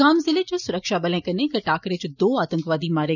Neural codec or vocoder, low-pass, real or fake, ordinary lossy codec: none; 7.2 kHz; real; none